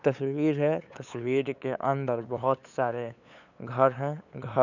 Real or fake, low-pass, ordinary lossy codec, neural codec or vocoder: fake; 7.2 kHz; none; codec, 16 kHz, 8 kbps, FunCodec, trained on LibriTTS, 25 frames a second